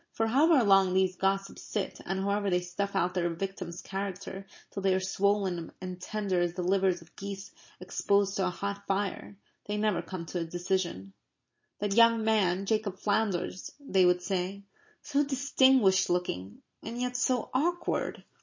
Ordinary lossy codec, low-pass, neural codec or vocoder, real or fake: MP3, 32 kbps; 7.2 kHz; none; real